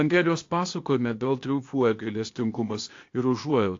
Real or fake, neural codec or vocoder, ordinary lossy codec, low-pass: fake; codec, 16 kHz, 0.8 kbps, ZipCodec; AAC, 48 kbps; 7.2 kHz